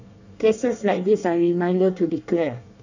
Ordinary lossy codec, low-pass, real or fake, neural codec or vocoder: none; 7.2 kHz; fake; codec, 24 kHz, 1 kbps, SNAC